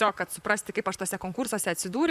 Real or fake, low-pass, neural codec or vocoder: fake; 14.4 kHz; vocoder, 44.1 kHz, 128 mel bands, Pupu-Vocoder